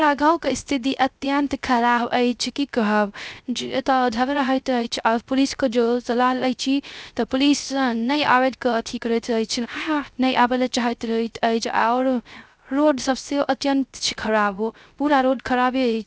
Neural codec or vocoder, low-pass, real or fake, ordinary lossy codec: codec, 16 kHz, 0.3 kbps, FocalCodec; none; fake; none